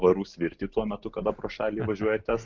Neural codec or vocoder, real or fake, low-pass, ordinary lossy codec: none; real; 7.2 kHz; Opus, 24 kbps